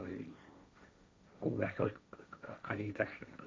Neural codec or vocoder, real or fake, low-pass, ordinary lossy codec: codec, 16 kHz, 1.1 kbps, Voila-Tokenizer; fake; none; none